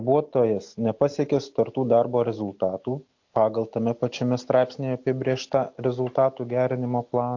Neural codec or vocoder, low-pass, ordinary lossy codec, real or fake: none; 7.2 kHz; AAC, 48 kbps; real